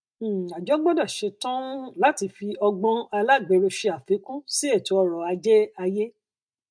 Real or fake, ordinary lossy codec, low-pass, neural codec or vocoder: real; MP3, 64 kbps; 9.9 kHz; none